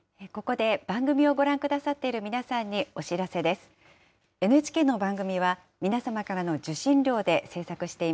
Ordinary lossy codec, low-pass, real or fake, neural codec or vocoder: none; none; real; none